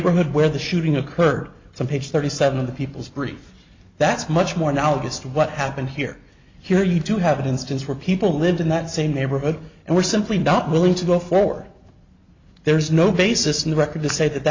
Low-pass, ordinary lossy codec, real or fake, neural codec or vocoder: 7.2 kHz; MP3, 64 kbps; real; none